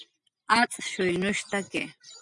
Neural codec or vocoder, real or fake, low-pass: none; real; 10.8 kHz